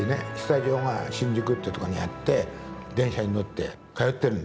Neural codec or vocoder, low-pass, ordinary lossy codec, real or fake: none; none; none; real